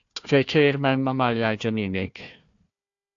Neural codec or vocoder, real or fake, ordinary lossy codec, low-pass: codec, 16 kHz, 1 kbps, FunCodec, trained on Chinese and English, 50 frames a second; fake; AAC, 48 kbps; 7.2 kHz